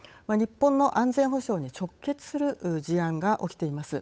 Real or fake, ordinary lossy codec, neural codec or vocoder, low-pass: fake; none; codec, 16 kHz, 8 kbps, FunCodec, trained on Chinese and English, 25 frames a second; none